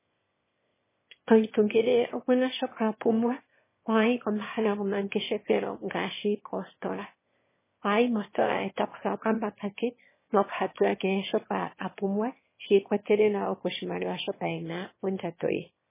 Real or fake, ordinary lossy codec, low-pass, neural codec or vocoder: fake; MP3, 16 kbps; 3.6 kHz; autoencoder, 22.05 kHz, a latent of 192 numbers a frame, VITS, trained on one speaker